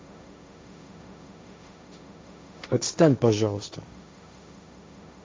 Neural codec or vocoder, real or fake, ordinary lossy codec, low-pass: codec, 16 kHz, 1.1 kbps, Voila-Tokenizer; fake; none; none